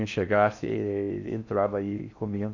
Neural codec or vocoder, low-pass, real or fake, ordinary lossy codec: codec, 16 kHz in and 24 kHz out, 0.6 kbps, FocalCodec, streaming, 4096 codes; 7.2 kHz; fake; none